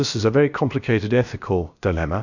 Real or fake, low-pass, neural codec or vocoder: fake; 7.2 kHz; codec, 16 kHz, about 1 kbps, DyCAST, with the encoder's durations